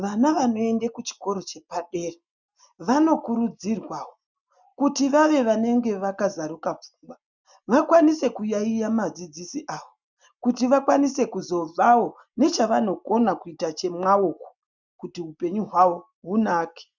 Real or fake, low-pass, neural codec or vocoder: real; 7.2 kHz; none